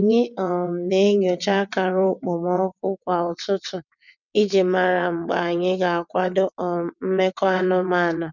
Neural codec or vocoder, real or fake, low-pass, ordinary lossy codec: vocoder, 22.05 kHz, 80 mel bands, WaveNeXt; fake; 7.2 kHz; none